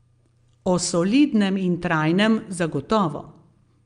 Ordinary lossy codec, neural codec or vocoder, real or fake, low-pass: Opus, 32 kbps; none; real; 9.9 kHz